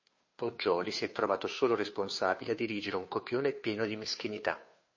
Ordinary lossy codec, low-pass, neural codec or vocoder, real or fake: MP3, 32 kbps; 7.2 kHz; codec, 16 kHz, 2 kbps, FunCodec, trained on Chinese and English, 25 frames a second; fake